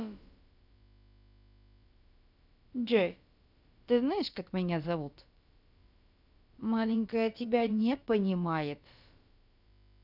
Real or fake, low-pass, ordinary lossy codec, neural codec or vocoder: fake; 5.4 kHz; none; codec, 16 kHz, about 1 kbps, DyCAST, with the encoder's durations